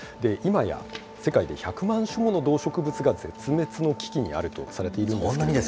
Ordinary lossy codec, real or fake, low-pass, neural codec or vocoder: none; real; none; none